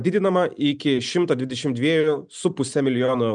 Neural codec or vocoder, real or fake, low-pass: none; real; 9.9 kHz